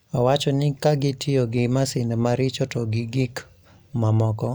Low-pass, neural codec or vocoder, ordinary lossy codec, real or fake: none; none; none; real